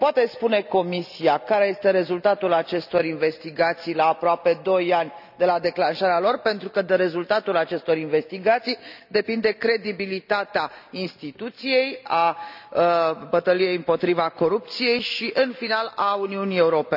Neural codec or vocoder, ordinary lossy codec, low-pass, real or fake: none; none; 5.4 kHz; real